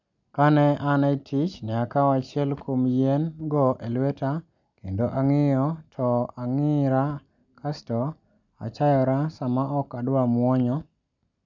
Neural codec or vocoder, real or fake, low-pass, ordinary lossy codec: none; real; 7.2 kHz; none